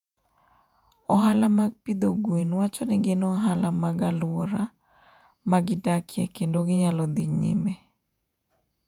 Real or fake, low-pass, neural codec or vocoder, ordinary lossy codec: fake; 19.8 kHz; vocoder, 48 kHz, 128 mel bands, Vocos; none